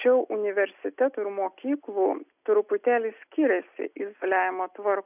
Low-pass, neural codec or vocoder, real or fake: 3.6 kHz; none; real